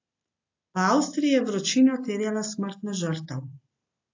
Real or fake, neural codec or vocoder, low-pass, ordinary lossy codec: real; none; 7.2 kHz; AAC, 48 kbps